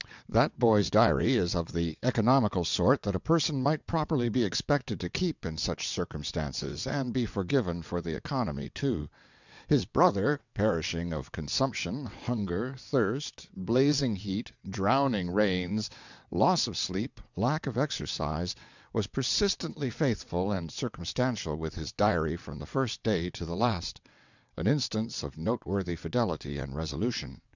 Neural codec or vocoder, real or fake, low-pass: vocoder, 22.05 kHz, 80 mel bands, WaveNeXt; fake; 7.2 kHz